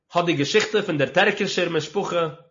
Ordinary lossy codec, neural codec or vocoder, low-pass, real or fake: MP3, 32 kbps; none; 7.2 kHz; real